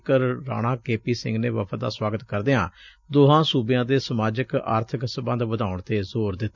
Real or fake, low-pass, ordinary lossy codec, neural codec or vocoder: real; 7.2 kHz; none; none